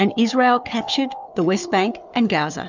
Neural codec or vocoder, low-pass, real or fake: codec, 16 kHz, 4 kbps, FunCodec, trained on Chinese and English, 50 frames a second; 7.2 kHz; fake